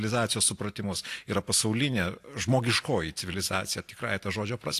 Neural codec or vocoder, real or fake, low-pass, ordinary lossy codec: none; real; 14.4 kHz; AAC, 64 kbps